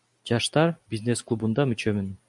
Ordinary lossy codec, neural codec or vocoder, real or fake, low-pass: MP3, 96 kbps; none; real; 10.8 kHz